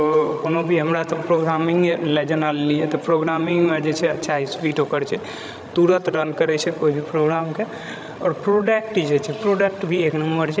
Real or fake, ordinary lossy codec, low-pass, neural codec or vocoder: fake; none; none; codec, 16 kHz, 16 kbps, FreqCodec, larger model